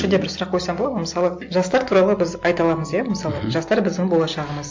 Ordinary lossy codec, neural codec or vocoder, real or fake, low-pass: MP3, 48 kbps; none; real; 7.2 kHz